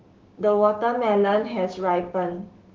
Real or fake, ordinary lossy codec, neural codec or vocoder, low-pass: fake; Opus, 16 kbps; codec, 16 kHz, 6 kbps, DAC; 7.2 kHz